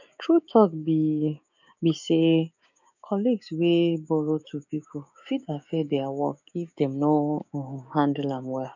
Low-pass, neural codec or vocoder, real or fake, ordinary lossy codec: 7.2 kHz; codec, 16 kHz, 6 kbps, DAC; fake; none